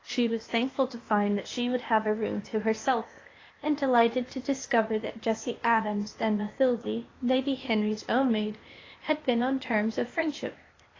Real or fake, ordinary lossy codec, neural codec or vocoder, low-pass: fake; AAC, 32 kbps; codec, 16 kHz, 0.8 kbps, ZipCodec; 7.2 kHz